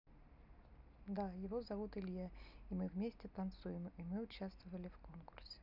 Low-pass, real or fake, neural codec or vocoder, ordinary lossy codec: 5.4 kHz; real; none; none